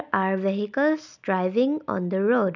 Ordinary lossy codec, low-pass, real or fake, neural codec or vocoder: none; 7.2 kHz; real; none